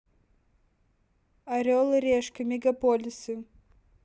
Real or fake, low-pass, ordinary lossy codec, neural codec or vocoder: real; none; none; none